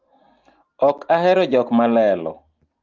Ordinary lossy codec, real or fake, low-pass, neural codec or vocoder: Opus, 32 kbps; real; 7.2 kHz; none